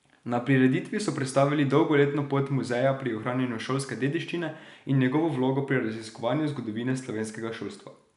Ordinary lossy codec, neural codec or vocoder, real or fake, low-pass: none; none; real; 10.8 kHz